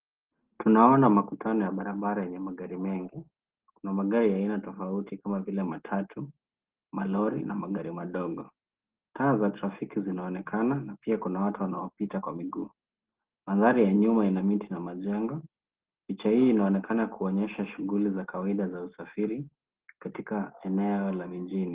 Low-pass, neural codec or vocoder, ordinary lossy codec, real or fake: 3.6 kHz; none; Opus, 16 kbps; real